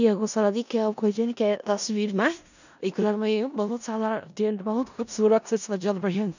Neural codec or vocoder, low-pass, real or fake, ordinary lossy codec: codec, 16 kHz in and 24 kHz out, 0.4 kbps, LongCat-Audio-Codec, four codebook decoder; 7.2 kHz; fake; none